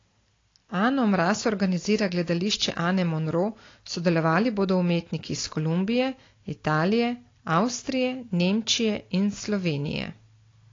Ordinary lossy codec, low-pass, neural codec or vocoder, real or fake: AAC, 32 kbps; 7.2 kHz; none; real